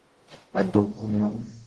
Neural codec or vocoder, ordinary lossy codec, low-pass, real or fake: codec, 44.1 kHz, 0.9 kbps, DAC; Opus, 16 kbps; 10.8 kHz; fake